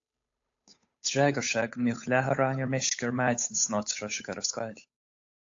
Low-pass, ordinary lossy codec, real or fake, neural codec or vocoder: 7.2 kHz; AAC, 48 kbps; fake; codec, 16 kHz, 8 kbps, FunCodec, trained on Chinese and English, 25 frames a second